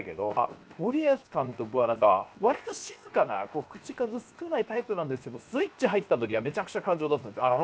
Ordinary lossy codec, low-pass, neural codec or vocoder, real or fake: none; none; codec, 16 kHz, 0.7 kbps, FocalCodec; fake